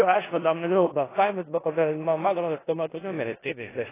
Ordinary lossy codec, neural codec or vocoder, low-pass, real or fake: AAC, 16 kbps; codec, 16 kHz in and 24 kHz out, 0.4 kbps, LongCat-Audio-Codec, four codebook decoder; 3.6 kHz; fake